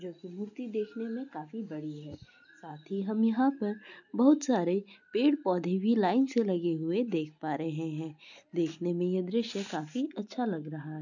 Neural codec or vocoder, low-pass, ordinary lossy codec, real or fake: none; 7.2 kHz; none; real